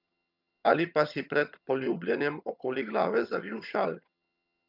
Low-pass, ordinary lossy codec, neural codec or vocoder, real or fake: 5.4 kHz; none; vocoder, 22.05 kHz, 80 mel bands, HiFi-GAN; fake